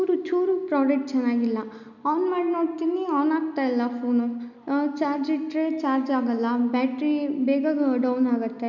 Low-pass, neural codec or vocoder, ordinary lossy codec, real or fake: 7.2 kHz; none; none; real